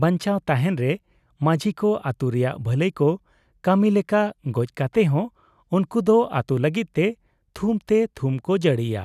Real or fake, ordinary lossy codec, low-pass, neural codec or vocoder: real; none; 14.4 kHz; none